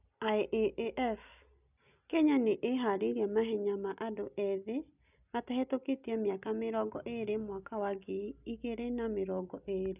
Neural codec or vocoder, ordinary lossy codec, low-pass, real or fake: none; none; 3.6 kHz; real